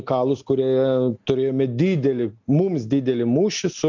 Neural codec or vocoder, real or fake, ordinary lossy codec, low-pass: none; real; MP3, 64 kbps; 7.2 kHz